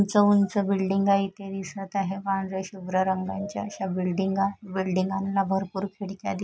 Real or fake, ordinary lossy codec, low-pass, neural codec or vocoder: real; none; none; none